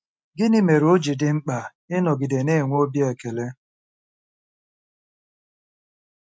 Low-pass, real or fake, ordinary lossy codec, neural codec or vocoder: none; real; none; none